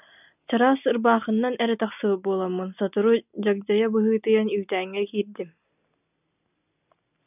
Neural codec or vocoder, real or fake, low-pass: none; real; 3.6 kHz